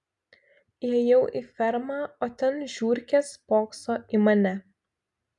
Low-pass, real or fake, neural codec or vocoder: 10.8 kHz; real; none